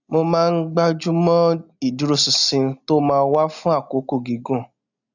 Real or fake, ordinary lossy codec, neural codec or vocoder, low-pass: real; none; none; 7.2 kHz